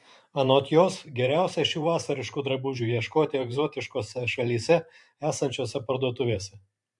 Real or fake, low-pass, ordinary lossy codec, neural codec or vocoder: fake; 10.8 kHz; MP3, 64 kbps; vocoder, 44.1 kHz, 128 mel bands every 512 samples, BigVGAN v2